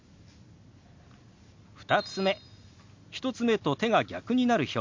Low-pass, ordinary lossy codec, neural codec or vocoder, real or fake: 7.2 kHz; MP3, 48 kbps; none; real